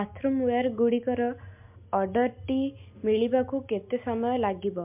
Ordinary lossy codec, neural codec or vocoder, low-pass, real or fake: MP3, 32 kbps; none; 3.6 kHz; real